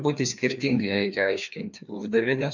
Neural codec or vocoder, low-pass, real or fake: codec, 16 kHz, 2 kbps, FreqCodec, larger model; 7.2 kHz; fake